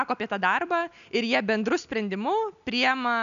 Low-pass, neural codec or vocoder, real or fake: 7.2 kHz; none; real